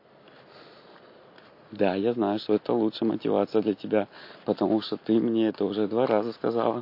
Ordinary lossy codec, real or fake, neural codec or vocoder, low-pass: MP3, 32 kbps; real; none; 5.4 kHz